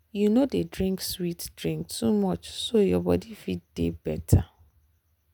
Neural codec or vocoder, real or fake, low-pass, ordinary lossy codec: none; real; none; none